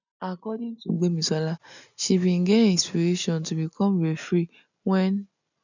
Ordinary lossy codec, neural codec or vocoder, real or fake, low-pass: none; none; real; 7.2 kHz